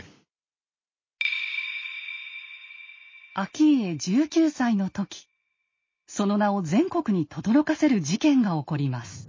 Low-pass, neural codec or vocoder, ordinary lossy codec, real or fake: 7.2 kHz; none; MP3, 32 kbps; real